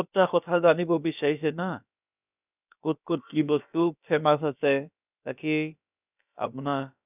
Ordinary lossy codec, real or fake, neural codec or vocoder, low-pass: none; fake; codec, 16 kHz, about 1 kbps, DyCAST, with the encoder's durations; 3.6 kHz